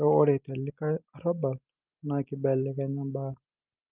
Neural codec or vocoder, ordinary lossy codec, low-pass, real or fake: none; Opus, 32 kbps; 3.6 kHz; real